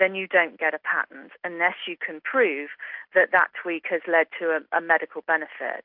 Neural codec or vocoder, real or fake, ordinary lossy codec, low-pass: none; real; AAC, 48 kbps; 5.4 kHz